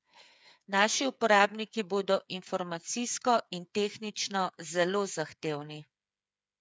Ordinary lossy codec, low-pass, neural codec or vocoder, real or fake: none; none; codec, 16 kHz, 8 kbps, FreqCodec, smaller model; fake